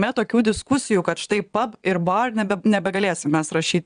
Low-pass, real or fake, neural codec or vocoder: 9.9 kHz; fake; vocoder, 22.05 kHz, 80 mel bands, Vocos